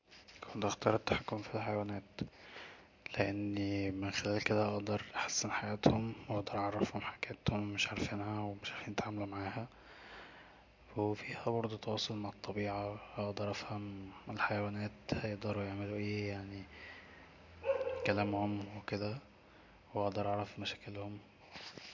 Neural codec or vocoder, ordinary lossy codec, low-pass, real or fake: none; MP3, 48 kbps; 7.2 kHz; real